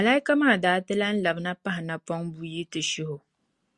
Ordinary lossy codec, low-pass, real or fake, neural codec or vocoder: Opus, 64 kbps; 10.8 kHz; real; none